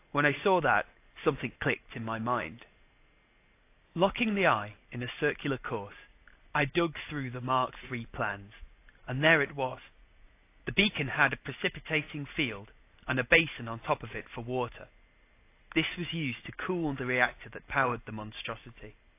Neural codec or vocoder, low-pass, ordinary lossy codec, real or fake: none; 3.6 kHz; AAC, 24 kbps; real